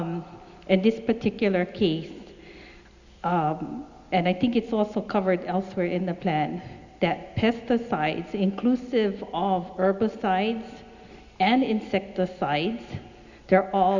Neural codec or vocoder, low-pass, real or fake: none; 7.2 kHz; real